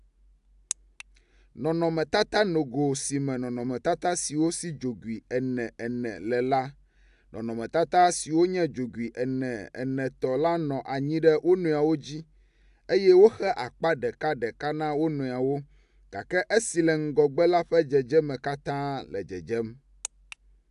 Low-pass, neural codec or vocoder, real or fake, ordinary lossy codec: 10.8 kHz; none; real; AAC, 96 kbps